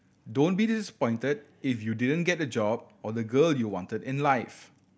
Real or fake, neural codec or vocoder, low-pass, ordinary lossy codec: real; none; none; none